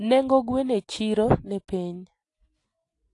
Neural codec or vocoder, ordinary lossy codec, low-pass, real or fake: none; AAC, 48 kbps; 10.8 kHz; real